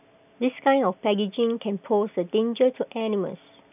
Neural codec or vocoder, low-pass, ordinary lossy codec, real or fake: none; 3.6 kHz; none; real